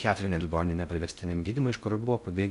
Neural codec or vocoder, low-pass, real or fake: codec, 16 kHz in and 24 kHz out, 0.6 kbps, FocalCodec, streaming, 2048 codes; 10.8 kHz; fake